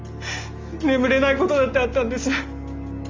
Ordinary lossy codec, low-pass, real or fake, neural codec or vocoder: Opus, 32 kbps; 7.2 kHz; real; none